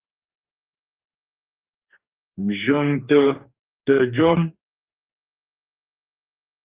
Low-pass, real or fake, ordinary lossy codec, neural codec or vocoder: 3.6 kHz; fake; Opus, 32 kbps; codec, 44.1 kHz, 2.6 kbps, DAC